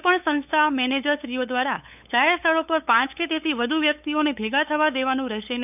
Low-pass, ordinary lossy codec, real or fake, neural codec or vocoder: 3.6 kHz; none; fake; codec, 16 kHz, 8 kbps, FunCodec, trained on LibriTTS, 25 frames a second